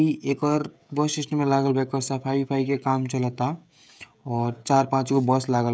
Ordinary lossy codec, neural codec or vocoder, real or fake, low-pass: none; codec, 16 kHz, 16 kbps, FreqCodec, smaller model; fake; none